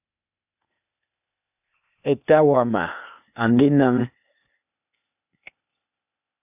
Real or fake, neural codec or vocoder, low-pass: fake; codec, 16 kHz, 0.8 kbps, ZipCodec; 3.6 kHz